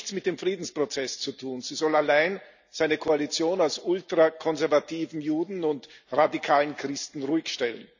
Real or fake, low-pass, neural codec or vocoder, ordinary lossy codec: real; 7.2 kHz; none; none